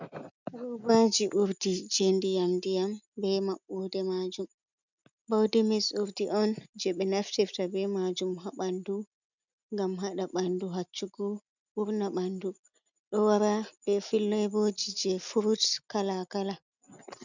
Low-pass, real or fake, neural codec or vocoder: 7.2 kHz; real; none